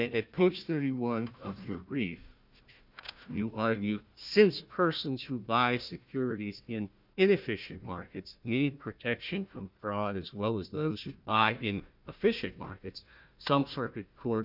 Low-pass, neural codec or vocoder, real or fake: 5.4 kHz; codec, 16 kHz, 1 kbps, FunCodec, trained on Chinese and English, 50 frames a second; fake